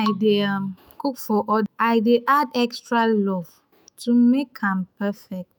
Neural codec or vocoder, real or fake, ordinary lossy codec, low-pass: autoencoder, 48 kHz, 128 numbers a frame, DAC-VAE, trained on Japanese speech; fake; none; none